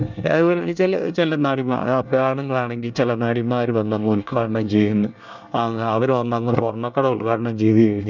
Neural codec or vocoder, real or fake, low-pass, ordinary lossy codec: codec, 24 kHz, 1 kbps, SNAC; fake; 7.2 kHz; none